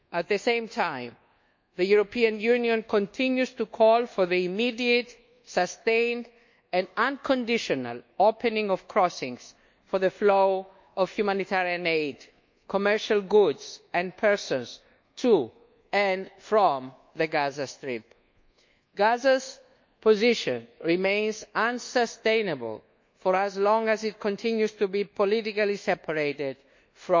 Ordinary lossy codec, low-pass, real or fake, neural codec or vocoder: MP3, 48 kbps; 7.2 kHz; fake; codec, 24 kHz, 1.2 kbps, DualCodec